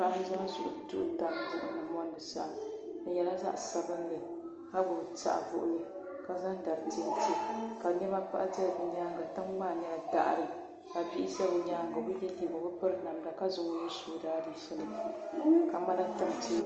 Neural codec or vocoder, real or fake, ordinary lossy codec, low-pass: none; real; Opus, 24 kbps; 7.2 kHz